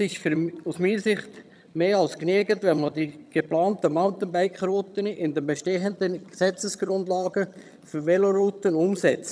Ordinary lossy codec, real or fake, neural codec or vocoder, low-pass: none; fake; vocoder, 22.05 kHz, 80 mel bands, HiFi-GAN; none